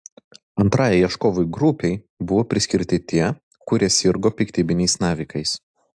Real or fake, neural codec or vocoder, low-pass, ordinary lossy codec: real; none; 9.9 kHz; AAC, 64 kbps